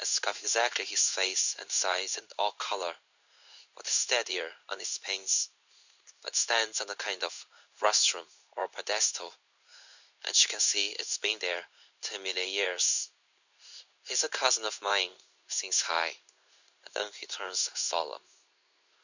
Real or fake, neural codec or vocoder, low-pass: fake; codec, 16 kHz in and 24 kHz out, 1 kbps, XY-Tokenizer; 7.2 kHz